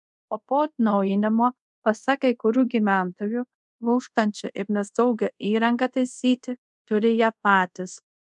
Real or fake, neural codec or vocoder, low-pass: fake; codec, 24 kHz, 0.5 kbps, DualCodec; 10.8 kHz